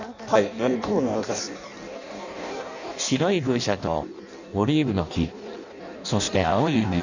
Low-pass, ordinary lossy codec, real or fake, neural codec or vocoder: 7.2 kHz; none; fake; codec, 16 kHz in and 24 kHz out, 0.6 kbps, FireRedTTS-2 codec